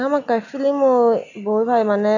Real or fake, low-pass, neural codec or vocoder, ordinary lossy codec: real; 7.2 kHz; none; none